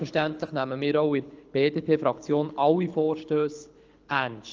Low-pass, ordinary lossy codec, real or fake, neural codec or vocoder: 7.2 kHz; Opus, 24 kbps; fake; codec, 24 kHz, 6 kbps, HILCodec